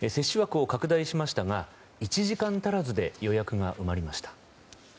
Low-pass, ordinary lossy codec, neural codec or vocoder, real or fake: none; none; none; real